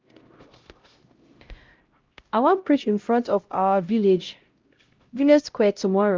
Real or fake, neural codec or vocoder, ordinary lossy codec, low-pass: fake; codec, 16 kHz, 0.5 kbps, X-Codec, HuBERT features, trained on LibriSpeech; Opus, 24 kbps; 7.2 kHz